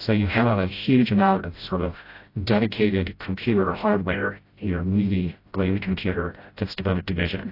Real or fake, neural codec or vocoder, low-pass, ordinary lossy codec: fake; codec, 16 kHz, 0.5 kbps, FreqCodec, smaller model; 5.4 kHz; AAC, 32 kbps